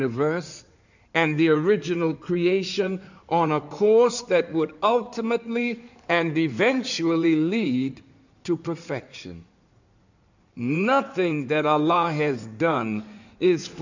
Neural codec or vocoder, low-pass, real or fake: codec, 16 kHz in and 24 kHz out, 2.2 kbps, FireRedTTS-2 codec; 7.2 kHz; fake